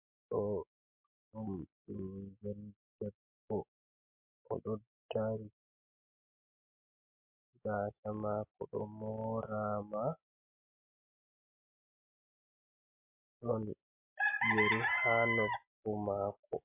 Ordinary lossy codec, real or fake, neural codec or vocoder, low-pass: MP3, 32 kbps; real; none; 3.6 kHz